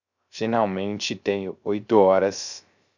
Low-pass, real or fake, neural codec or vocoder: 7.2 kHz; fake; codec, 16 kHz, 0.3 kbps, FocalCodec